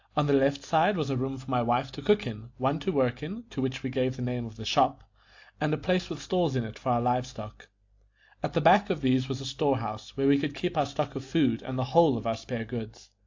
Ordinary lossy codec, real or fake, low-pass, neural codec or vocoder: AAC, 48 kbps; real; 7.2 kHz; none